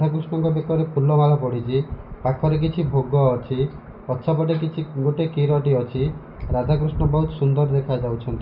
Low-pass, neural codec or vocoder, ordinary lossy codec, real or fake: 5.4 kHz; none; none; real